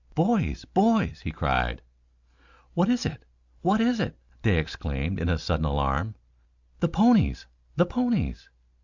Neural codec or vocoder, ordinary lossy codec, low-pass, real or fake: none; Opus, 64 kbps; 7.2 kHz; real